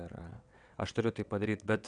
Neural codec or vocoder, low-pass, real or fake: vocoder, 22.05 kHz, 80 mel bands, WaveNeXt; 9.9 kHz; fake